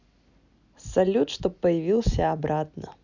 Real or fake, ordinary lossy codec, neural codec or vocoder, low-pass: real; none; none; 7.2 kHz